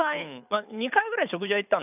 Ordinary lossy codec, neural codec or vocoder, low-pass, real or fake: none; codec, 24 kHz, 6 kbps, HILCodec; 3.6 kHz; fake